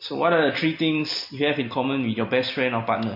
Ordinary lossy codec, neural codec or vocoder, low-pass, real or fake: MP3, 32 kbps; none; 5.4 kHz; real